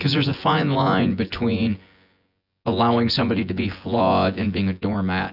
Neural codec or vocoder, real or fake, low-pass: vocoder, 24 kHz, 100 mel bands, Vocos; fake; 5.4 kHz